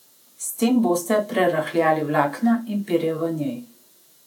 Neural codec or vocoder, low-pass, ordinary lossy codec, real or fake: vocoder, 48 kHz, 128 mel bands, Vocos; 19.8 kHz; none; fake